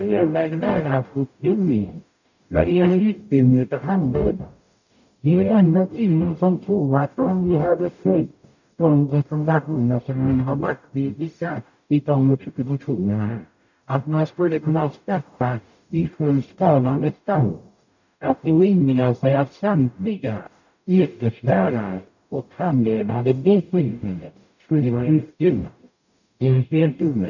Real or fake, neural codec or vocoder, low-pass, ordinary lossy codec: fake; codec, 44.1 kHz, 0.9 kbps, DAC; 7.2 kHz; none